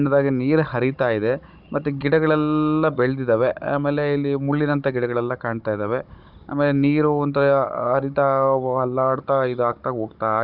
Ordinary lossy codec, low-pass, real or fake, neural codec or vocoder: none; 5.4 kHz; fake; codec, 16 kHz, 16 kbps, FunCodec, trained on Chinese and English, 50 frames a second